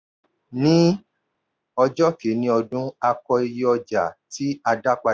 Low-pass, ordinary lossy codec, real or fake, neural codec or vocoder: none; none; real; none